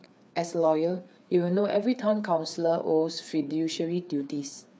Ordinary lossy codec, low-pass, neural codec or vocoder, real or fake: none; none; codec, 16 kHz, 4 kbps, FreqCodec, larger model; fake